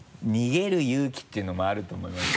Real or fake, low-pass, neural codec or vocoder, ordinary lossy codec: real; none; none; none